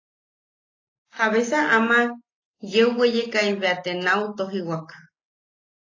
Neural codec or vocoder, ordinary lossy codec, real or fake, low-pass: none; AAC, 32 kbps; real; 7.2 kHz